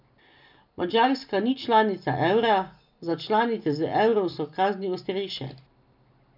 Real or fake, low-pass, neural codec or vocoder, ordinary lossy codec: real; 5.4 kHz; none; none